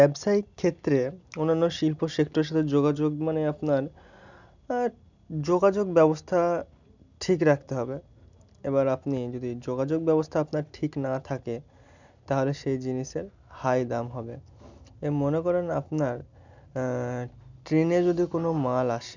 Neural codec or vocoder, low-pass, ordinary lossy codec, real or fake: none; 7.2 kHz; none; real